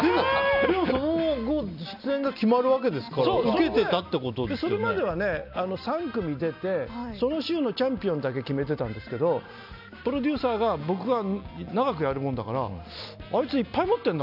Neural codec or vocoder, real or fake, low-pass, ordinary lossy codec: none; real; 5.4 kHz; none